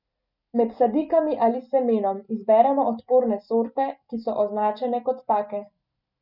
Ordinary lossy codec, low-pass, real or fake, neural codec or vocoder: none; 5.4 kHz; real; none